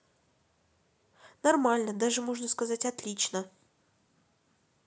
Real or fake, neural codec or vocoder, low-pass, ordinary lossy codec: real; none; none; none